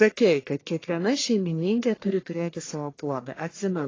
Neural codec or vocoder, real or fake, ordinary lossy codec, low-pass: codec, 44.1 kHz, 1.7 kbps, Pupu-Codec; fake; AAC, 32 kbps; 7.2 kHz